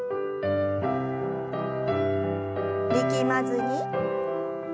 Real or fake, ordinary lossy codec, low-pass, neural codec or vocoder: real; none; none; none